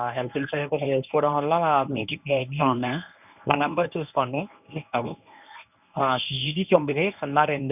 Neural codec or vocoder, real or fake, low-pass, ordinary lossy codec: codec, 24 kHz, 0.9 kbps, WavTokenizer, medium speech release version 1; fake; 3.6 kHz; none